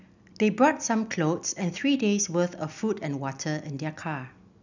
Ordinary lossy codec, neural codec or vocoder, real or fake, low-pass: none; none; real; 7.2 kHz